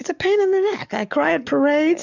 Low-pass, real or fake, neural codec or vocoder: 7.2 kHz; fake; codec, 44.1 kHz, 7.8 kbps, DAC